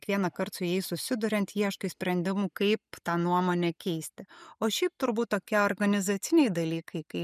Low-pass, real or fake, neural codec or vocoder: 14.4 kHz; real; none